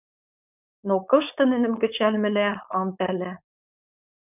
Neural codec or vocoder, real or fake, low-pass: codec, 16 kHz, 4.8 kbps, FACodec; fake; 3.6 kHz